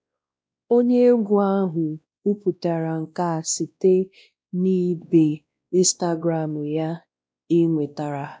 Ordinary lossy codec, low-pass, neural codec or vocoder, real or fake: none; none; codec, 16 kHz, 1 kbps, X-Codec, WavLM features, trained on Multilingual LibriSpeech; fake